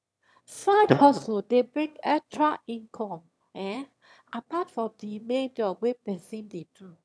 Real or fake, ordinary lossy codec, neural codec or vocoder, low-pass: fake; none; autoencoder, 22.05 kHz, a latent of 192 numbers a frame, VITS, trained on one speaker; none